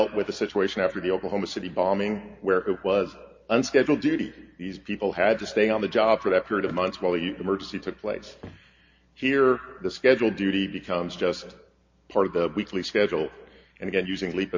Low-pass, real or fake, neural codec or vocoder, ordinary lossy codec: 7.2 kHz; real; none; MP3, 48 kbps